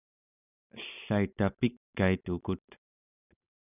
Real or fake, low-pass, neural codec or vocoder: real; 3.6 kHz; none